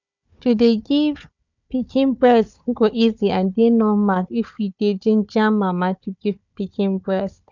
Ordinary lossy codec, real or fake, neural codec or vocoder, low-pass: none; fake; codec, 16 kHz, 4 kbps, FunCodec, trained on Chinese and English, 50 frames a second; 7.2 kHz